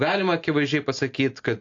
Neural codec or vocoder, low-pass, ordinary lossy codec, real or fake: none; 7.2 kHz; AAC, 48 kbps; real